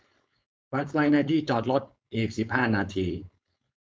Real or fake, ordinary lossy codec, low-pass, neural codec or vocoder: fake; none; none; codec, 16 kHz, 4.8 kbps, FACodec